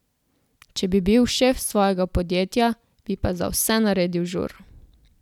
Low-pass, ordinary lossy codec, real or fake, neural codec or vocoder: 19.8 kHz; none; real; none